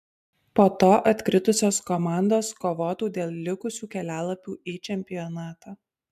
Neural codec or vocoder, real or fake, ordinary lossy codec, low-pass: none; real; MP3, 96 kbps; 14.4 kHz